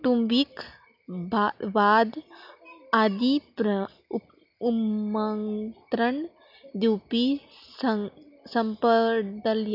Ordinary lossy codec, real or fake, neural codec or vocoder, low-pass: none; real; none; 5.4 kHz